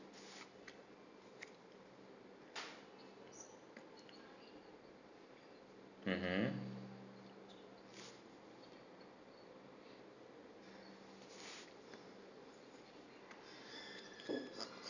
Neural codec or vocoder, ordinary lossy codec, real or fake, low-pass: none; none; real; 7.2 kHz